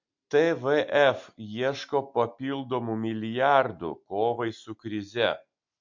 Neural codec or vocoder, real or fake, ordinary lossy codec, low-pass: none; real; MP3, 48 kbps; 7.2 kHz